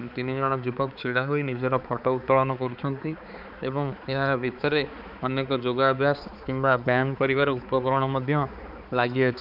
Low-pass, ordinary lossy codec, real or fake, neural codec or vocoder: 5.4 kHz; none; fake; codec, 16 kHz, 4 kbps, X-Codec, HuBERT features, trained on balanced general audio